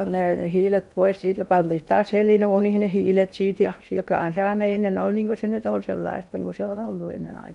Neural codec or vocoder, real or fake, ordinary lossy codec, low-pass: codec, 16 kHz in and 24 kHz out, 0.8 kbps, FocalCodec, streaming, 65536 codes; fake; none; 10.8 kHz